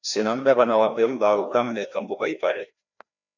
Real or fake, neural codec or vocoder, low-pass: fake; codec, 16 kHz, 1 kbps, FreqCodec, larger model; 7.2 kHz